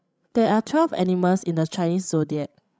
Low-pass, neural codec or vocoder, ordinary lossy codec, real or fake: none; codec, 16 kHz, 16 kbps, FreqCodec, larger model; none; fake